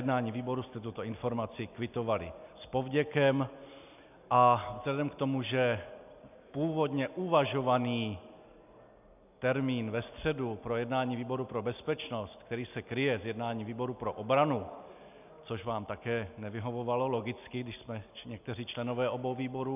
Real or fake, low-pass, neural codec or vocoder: real; 3.6 kHz; none